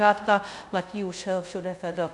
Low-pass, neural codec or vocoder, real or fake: 10.8 kHz; codec, 24 kHz, 0.5 kbps, DualCodec; fake